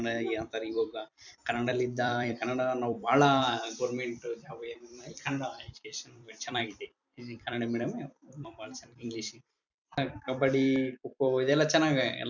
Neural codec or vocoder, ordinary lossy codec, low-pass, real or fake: vocoder, 44.1 kHz, 128 mel bands every 256 samples, BigVGAN v2; none; 7.2 kHz; fake